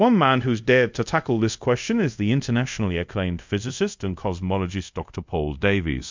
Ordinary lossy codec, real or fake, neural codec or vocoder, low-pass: MP3, 64 kbps; fake; codec, 24 kHz, 0.5 kbps, DualCodec; 7.2 kHz